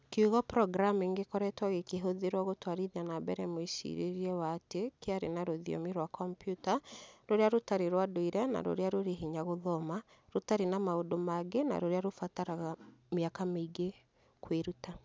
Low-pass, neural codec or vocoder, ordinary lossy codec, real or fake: none; none; none; real